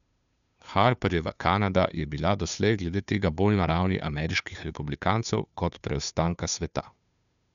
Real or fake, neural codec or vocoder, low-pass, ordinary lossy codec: fake; codec, 16 kHz, 2 kbps, FunCodec, trained on Chinese and English, 25 frames a second; 7.2 kHz; none